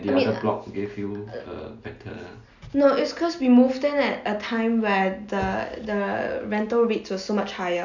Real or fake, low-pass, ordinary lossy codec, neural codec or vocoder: real; 7.2 kHz; none; none